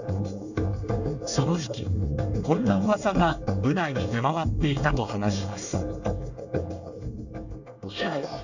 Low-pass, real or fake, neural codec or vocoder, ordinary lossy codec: 7.2 kHz; fake; codec, 24 kHz, 1 kbps, SNAC; none